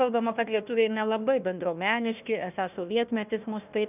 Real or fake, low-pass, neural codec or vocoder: fake; 3.6 kHz; codec, 16 kHz, 1 kbps, FunCodec, trained on Chinese and English, 50 frames a second